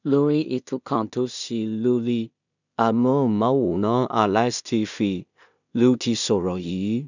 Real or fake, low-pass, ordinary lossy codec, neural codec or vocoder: fake; 7.2 kHz; none; codec, 16 kHz in and 24 kHz out, 0.4 kbps, LongCat-Audio-Codec, two codebook decoder